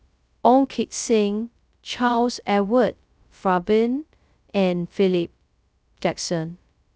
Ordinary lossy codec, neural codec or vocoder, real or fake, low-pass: none; codec, 16 kHz, 0.2 kbps, FocalCodec; fake; none